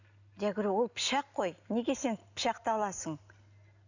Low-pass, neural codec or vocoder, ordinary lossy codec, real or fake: 7.2 kHz; none; none; real